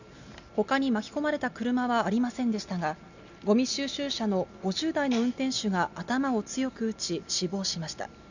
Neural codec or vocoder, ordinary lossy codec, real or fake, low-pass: none; none; real; 7.2 kHz